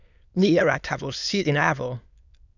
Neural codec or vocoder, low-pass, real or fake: autoencoder, 22.05 kHz, a latent of 192 numbers a frame, VITS, trained on many speakers; 7.2 kHz; fake